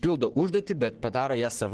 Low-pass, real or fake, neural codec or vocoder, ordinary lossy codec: 10.8 kHz; fake; codec, 44.1 kHz, 3.4 kbps, Pupu-Codec; Opus, 16 kbps